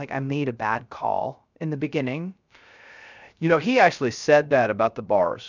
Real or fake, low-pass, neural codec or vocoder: fake; 7.2 kHz; codec, 16 kHz, 0.3 kbps, FocalCodec